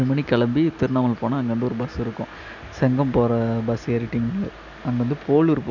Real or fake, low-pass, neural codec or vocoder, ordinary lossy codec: real; 7.2 kHz; none; none